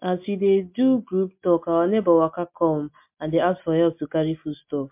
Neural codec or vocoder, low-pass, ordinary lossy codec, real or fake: none; 3.6 kHz; MP3, 32 kbps; real